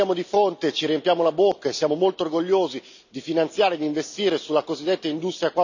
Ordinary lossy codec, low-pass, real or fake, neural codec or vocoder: none; 7.2 kHz; real; none